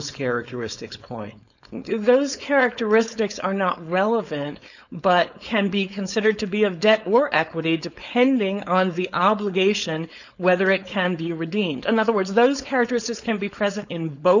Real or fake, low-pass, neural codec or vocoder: fake; 7.2 kHz; codec, 16 kHz, 4.8 kbps, FACodec